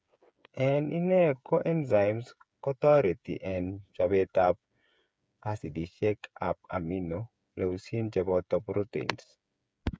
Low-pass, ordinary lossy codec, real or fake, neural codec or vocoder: none; none; fake; codec, 16 kHz, 8 kbps, FreqCodec, smaller model